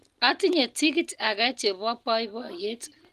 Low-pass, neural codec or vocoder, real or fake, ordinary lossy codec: 14.4 kHz; none; real; Opus, 24 kbps